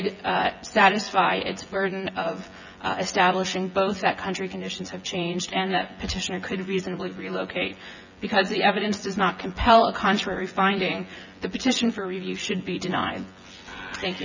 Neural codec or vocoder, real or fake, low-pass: vocoder, 24 kHz, 100 mel bands, Vocos; fake; 7.2 kHz